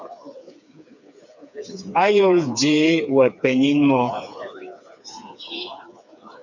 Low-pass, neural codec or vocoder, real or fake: 7.2 kHz; codec, 16 kHz, 4 kbps, FreqCodec, smaller model; fake